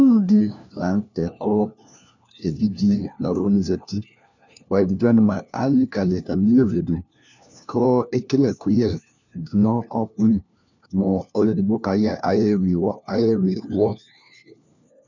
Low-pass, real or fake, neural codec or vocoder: 7.2 kHz; fake; codec, 16 kHz, 1 kbps, FunCodec, trained on LibriTTS, 50 frames a second